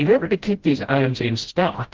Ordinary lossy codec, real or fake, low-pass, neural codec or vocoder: Opus, 16 kbps; fake; 7.2 kHz; codec, 16 kHz, 0.5 kbps, FreqCodec, smaller model